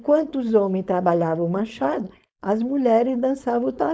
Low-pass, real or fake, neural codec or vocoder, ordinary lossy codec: none; fake; codec, 16 kHz, 4.8 kbps, FACodec; none